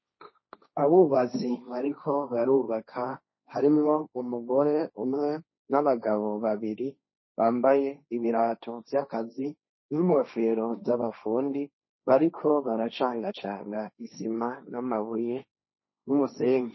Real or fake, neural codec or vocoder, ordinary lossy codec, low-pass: fake; codec, 16 kHz, 1.1 kbps, Voila-Tokenizer; MP3, 24 kbps; 7.2 kHz